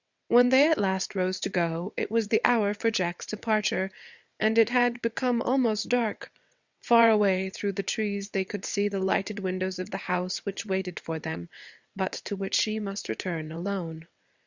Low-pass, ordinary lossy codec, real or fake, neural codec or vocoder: 7.2 kHz; Opus, 64 kbps; fake; vocoder, 22.05 kHz, 80 mel bands, WaveNeXt